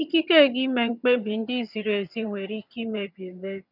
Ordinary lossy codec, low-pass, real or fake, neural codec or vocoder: none; 5.4 kHz; fake; vocoder, 22.05 kHz, 80 mel bands, HiFi-GAN